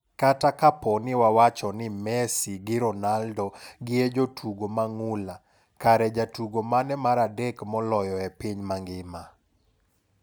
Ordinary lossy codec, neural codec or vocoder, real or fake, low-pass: none; none; real; none